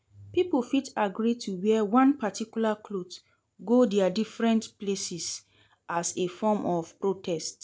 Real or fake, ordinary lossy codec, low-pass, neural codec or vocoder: real; none; none; none